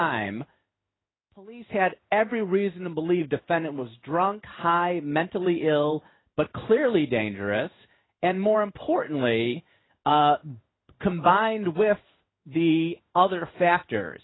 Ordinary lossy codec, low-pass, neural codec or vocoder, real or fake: AAC, 16 kbps; 7.2 kHz; codec, 16 kHz in and 24 kHz out, 1 kbps, XY-Tokenizer; fake